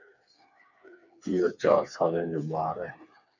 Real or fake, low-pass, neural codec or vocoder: fake; 7.2 kHz; codec, 16 kHz, 4 kbps, FreqCodec, smaller model